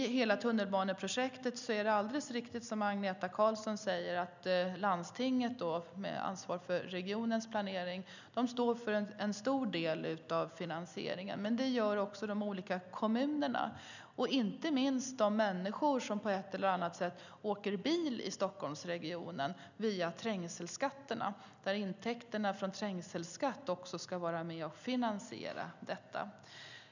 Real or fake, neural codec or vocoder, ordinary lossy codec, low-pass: real; none; none; 7.2 kHz